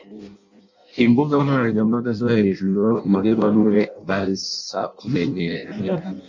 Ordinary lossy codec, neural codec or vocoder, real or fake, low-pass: MP3, 48 kbps; codec, 16 kHz in and 24 kHz out, 0.6 kbps, FireRedTTS-2 codec; fake; 7.2 kHz